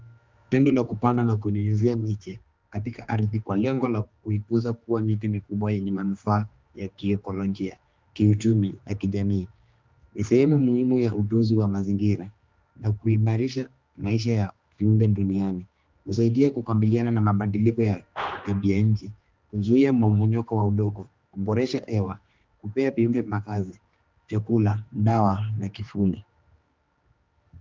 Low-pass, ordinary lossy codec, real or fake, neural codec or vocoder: 7.2 kHz; Opus, 32 kbps; fake; codec, 16 kHz, 2 kbps, X-Codec, HuBERT features, trained on general audio